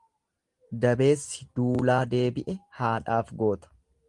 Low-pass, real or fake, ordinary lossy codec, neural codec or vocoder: 10.8 kHz; real; Opus, 24 kbps; none